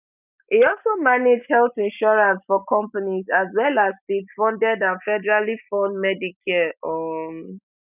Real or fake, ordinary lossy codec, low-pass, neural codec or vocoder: real; none; 3.6 kHz; none